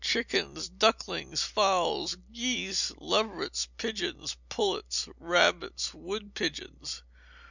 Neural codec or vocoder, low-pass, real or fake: none; 7.2 kHz; real